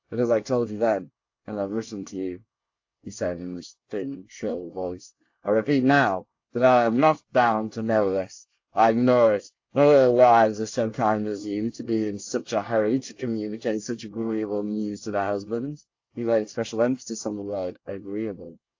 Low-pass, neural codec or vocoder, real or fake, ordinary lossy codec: 7.2 kHz; codec, 24 kHz, 1 kbps, SNAC; fake; AAC, 48 kbps